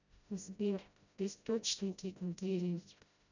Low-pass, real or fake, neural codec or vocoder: 7.2 kHz; fake; codec, 16 kHz, 0.5 kbps, FreqCodec, smaller model